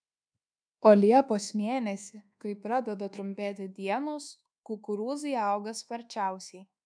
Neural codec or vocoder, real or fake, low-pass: codec, 24 kHz, 1.2 kbps, DualCodec; fake; 9.9 kHz